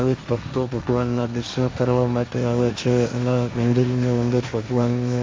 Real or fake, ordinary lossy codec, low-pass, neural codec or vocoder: fake; none; none; codec, 16 kHz, 1.1 kbps, Voila-Tokenizer